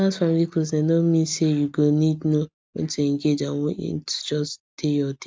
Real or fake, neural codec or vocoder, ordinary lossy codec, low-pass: real; none; none; none